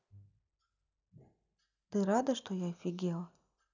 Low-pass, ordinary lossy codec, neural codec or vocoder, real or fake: 7.2 kHz; none; none; real